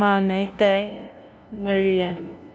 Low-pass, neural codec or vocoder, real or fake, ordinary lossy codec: none; codec, 16 kHz, 0.5 kbps, FunCodec, trained on LibriTTS, 25 frames a second; fake; none